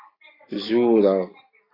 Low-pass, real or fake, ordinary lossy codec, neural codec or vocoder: 5.4 kHz; real; AAC, 24 kbps; none